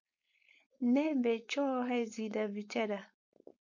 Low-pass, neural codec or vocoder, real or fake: 7.2 kHz; codec, 16 kHz, 4.8 kbps, FACodec; fake